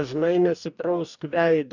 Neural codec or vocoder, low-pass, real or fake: codec, 44.1 kHz, 2.6 kbps, DAC; 7.2 kHz; fake